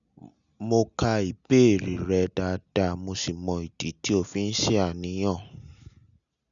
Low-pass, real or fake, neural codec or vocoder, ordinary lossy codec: 7.2 kHz; real; none; none